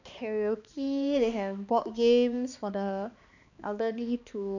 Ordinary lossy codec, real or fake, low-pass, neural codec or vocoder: none; fake; 7.2 kHz; codec, 16 kHz, 2 kbps, X-Codec, HuBERT features, trained on balanced general audio